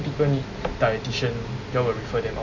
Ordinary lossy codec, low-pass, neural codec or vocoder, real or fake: none; 7.2 kHz; none; real